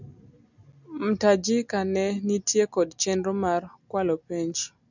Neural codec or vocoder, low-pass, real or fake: none; 7.2 kHz; real